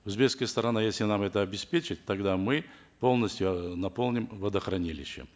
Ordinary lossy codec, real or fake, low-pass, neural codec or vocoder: none; real; none; none